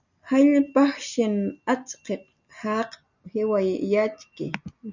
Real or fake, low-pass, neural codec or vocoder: real; 7.2 kHz; none